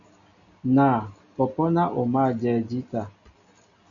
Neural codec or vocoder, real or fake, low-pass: none; real; 7.2 kHz